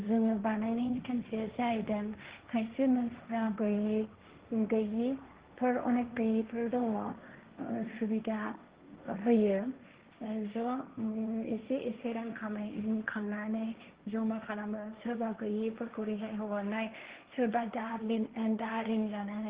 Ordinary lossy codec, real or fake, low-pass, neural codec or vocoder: Opus, 16 kbps; fake; 3.6 kHz; codec, 16 kHz, 1.1 kbps, Voila-Tokenizer